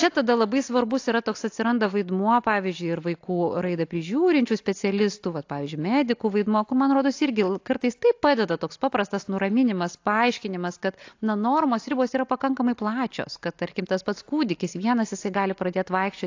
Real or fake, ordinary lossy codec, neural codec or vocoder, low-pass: real; AAC, 48 kbps; none; 7.2 kHz